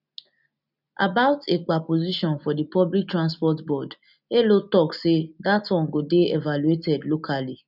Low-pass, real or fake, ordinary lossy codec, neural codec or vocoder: 5.4 kHz; real; none; none